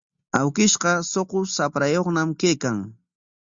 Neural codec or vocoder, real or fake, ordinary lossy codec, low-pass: none; real; Opus, 64 kbps; 7.2 kHz